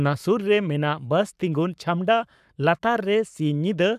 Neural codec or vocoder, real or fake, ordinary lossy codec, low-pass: codec, 44.1 kHz, 7.8 kbps, Pupu-Codec; fake; none; 14.4 kHz